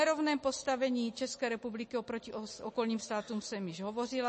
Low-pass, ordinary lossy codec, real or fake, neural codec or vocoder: 9.9 kHz; MP3, 32 kbps; real; none